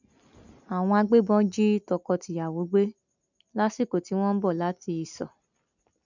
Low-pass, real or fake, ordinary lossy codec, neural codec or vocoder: 7.2 kHz; real; none; none